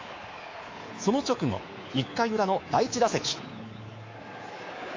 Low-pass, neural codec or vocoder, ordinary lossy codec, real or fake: 7.2 kHz; codec, 24 kHz, 3.1 kbps, DualCodec; AAC, 32 kbps; fake